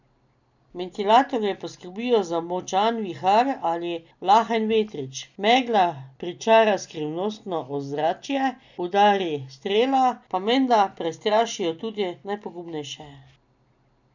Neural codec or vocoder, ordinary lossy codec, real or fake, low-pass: none; none; real; 7.2 kHz